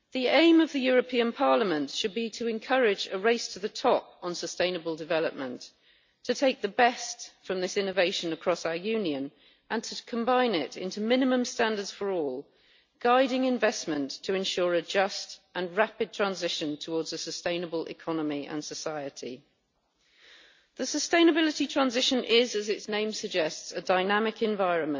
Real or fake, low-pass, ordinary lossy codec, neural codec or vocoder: real; 7.2 kHz; MP3, 48 kbps; none